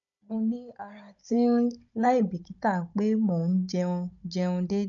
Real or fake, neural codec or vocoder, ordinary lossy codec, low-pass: fake; codec, 16 kHz, 16 kbps, FunCodec, trained on Chinese and English, 50 frames a second; none; 7.2 kHz